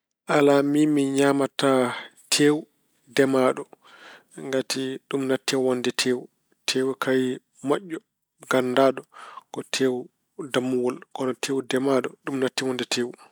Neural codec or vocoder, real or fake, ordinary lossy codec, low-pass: none; real; none; none